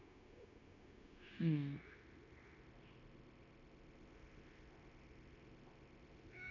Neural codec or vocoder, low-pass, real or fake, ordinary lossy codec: codec, 16 kHz, 0.9 kbps, LongCat-Audio-Codec; 7.2 kHz; fake; none